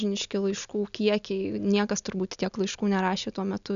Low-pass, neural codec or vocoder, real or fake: 7.2 kHz; none; real